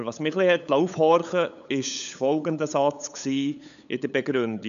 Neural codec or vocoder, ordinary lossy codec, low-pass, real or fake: codec, 16 kHz, 8 kbps, FunCodec, trained on LibriTTS, 25 frames a second; none; 7.2 kHz; fake